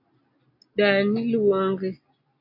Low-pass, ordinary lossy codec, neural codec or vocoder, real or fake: 5.4 kHz; AAC, 32 kbps; none; real